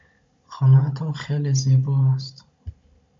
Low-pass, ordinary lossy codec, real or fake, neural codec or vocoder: 7.2 kHz; MP3, 48 kbps; fake; codec, 16 kHz, 16 kbps, FunCodec, trained on Chinese and English, 50 frames a second